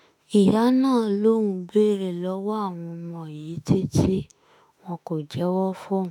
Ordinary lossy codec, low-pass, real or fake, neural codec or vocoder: none; 19.8 kHz; fake; autoencoder, 48 kHz, 32 numbers a frame, DAC-VAE, trained on Japanese speech